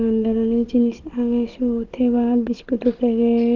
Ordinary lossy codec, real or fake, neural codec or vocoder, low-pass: Opus, 16 kbps; fake; codec, 16 kHz, 16 kbps, FunCodec, trained on Chinese and English, 50 frames a second; 7.2 kHz